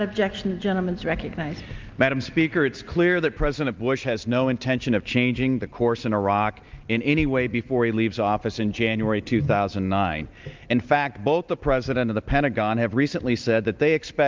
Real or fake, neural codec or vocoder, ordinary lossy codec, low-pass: real; none; Opus, 24 kbps; 7.2 kHz